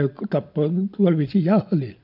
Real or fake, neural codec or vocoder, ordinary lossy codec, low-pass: real; none; MP3, 48 kbps; 5.4 kHz